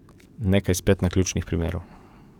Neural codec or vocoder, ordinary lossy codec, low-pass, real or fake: codec, 44.1 kHz, 7.8 kbps, DAC; none; 19.8 kHz; fake